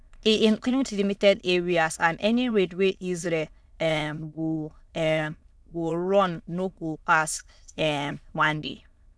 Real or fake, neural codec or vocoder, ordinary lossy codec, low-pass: fake; autoencoder, 22.05 kHz, a latent of 192 numbers a frame, VITS, trained on many speakers; none; none